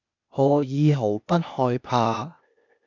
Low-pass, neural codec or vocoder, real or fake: 7.2 kHz; codec, 16 kHz, 0.8 kbps, ZipCodec; fake